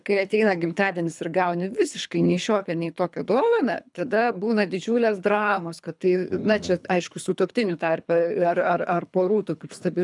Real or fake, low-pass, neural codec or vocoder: fake; 10.8 kHz; codec, 24 kHz, 3 kbps, HILCodec